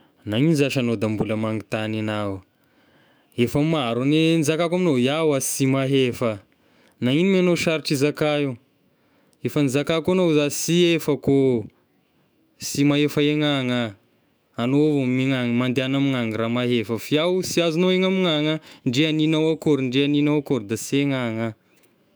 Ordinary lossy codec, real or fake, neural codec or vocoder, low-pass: none; fake; autoencoder, 48 kHz, 128 numbers a frame, DAC-VAE, trained on Japanese speech; none